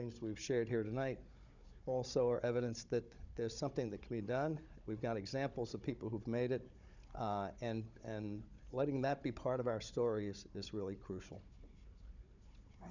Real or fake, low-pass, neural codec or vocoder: fake; 7.2 kHz; codec, 16 kHz, 16 kbps, FunCodec, trained on Chinese and English, 50 frames a second